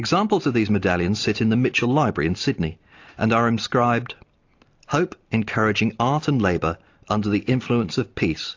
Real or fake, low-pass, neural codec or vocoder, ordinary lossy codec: real; 7.2 kHz; none; AAC, 48 kbps